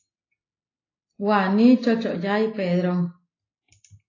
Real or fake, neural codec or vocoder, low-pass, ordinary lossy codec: real; none; 7.2 kHz; AAC, 32 kbps